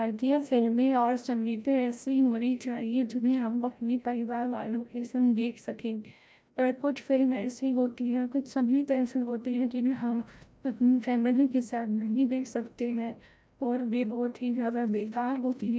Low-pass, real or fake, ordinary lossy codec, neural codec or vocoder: none; fake; none; codec, 16 kHz, 0.5 kbps, FreqCodec, larger model